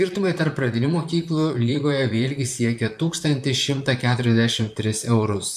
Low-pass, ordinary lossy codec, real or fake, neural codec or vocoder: 14.4 kHz; MP3, 96 kbps; fake; vocoder, 44.1 kHz, 128 mel bands, Pupu-Vocoder